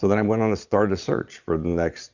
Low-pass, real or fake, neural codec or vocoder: 7.2 kHz; fake; vocoder, 22.05 kHz, 80 mel bands, Vocos